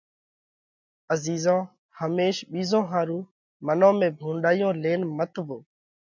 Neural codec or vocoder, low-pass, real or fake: none; 7.2 kHz; real